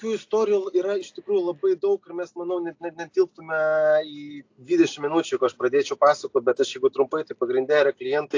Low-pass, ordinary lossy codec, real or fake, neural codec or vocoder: 7.2 kHz; AAC, 48 kbps; real; none